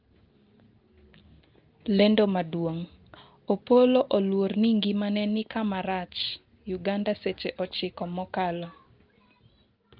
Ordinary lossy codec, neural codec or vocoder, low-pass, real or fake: Opus, 32 kbps; none; 5.4 kHz; real